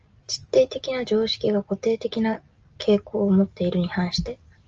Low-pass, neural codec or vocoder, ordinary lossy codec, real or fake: 7.2 kHz; none; Opus, 24 kbps; real